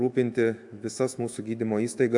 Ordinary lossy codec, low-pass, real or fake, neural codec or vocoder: AAC, 64 kbps; 10.8 kHz; fake; autoencoder, 48 kHz, 128 numbers a frame, DAC-VAE, trained on Japanese speech